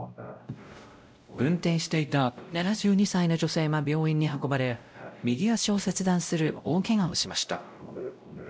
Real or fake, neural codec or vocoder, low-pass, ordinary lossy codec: fake; codec, 16 kHz, 0.5 kbps, X-Codec, WavLM features, trained on Multilingual LibriSpeech; none; none